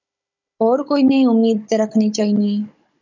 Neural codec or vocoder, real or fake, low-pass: codec, 16 kHz, 16 kbps, FunCodec, trained on Chinese and English, 50 frames a second; fake; 7.2 kHz